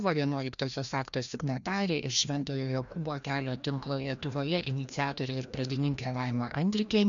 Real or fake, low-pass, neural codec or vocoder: fake; 7.2 kHz; codec, 16 kHz, 1 kbps, FreqCodec, larger model